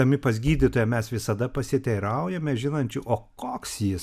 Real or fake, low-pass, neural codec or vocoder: real; 14.4 kHz; none